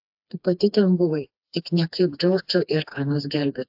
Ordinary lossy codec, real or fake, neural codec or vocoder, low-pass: AAC, 48 kbps; fake; codec, 16 kHz, 2 kbps, FreqCodec, smaller model; 5.4 kHz